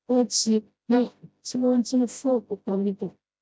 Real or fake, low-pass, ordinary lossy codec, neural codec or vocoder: fake; none; none; codec, 16 kHz, 0.5 kbps, FreqCodec, smaller model